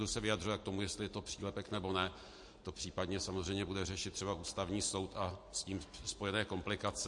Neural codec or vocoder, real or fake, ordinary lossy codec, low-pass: none; real; MP3, 48 kbps; 10.8 kHz